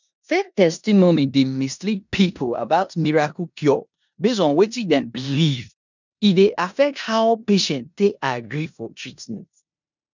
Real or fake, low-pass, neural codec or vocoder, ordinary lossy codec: fake; 7.2 kHz; codec, 16 kHz in and 24 kHz out, 0.9 kbps, LongCat-Audio-Codec, four codebook decoder; none